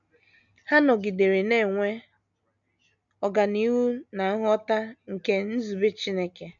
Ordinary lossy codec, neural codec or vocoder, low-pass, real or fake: none; none; 7.2 kHz; real